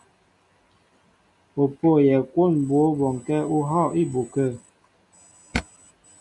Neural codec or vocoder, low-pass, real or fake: none; 10.8 kHz; real